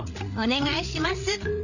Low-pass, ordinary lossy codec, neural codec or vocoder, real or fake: 7.2 kHz; none; codec, 16 kHz, 8 kbps, FreqCodec, larger model; fake